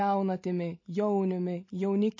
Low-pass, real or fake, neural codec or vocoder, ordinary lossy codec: 7.2 kHz; real; none; MP3, 32 kbps